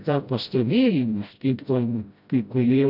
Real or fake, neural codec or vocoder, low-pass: fake; codec, 16 kHz, 0.5 kbps, FreqCodec, smaller model; 5.4 kHz